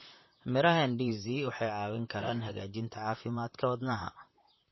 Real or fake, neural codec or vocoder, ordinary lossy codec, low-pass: fake; vocoder, 44.1 kHz, 128 mel bands, Pupu-Vocoder; MP3, 24 kbps; 7.2 kHz